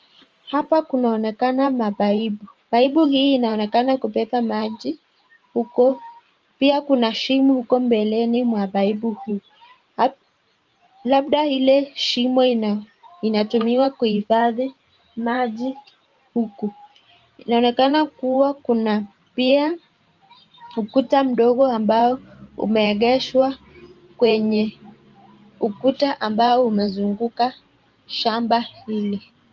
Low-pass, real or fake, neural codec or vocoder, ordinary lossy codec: 7.2 kHz; fake; vocoder, 44.1 kHz, 128 mel bands every 512 samples, BigVGAN v2; Opus, 24 kbps